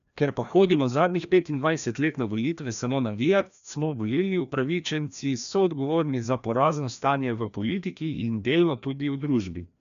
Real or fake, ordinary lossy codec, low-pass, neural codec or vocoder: fake; none; 7.2 kHz; codec, 16 kHz, 1 kbps, FreqCodec, larger model